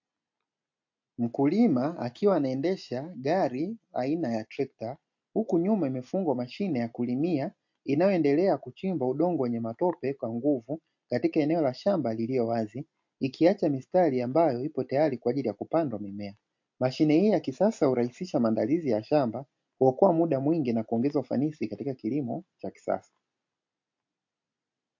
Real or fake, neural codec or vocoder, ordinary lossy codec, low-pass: real; none; MP3, 48 kbps; 7.2 kHz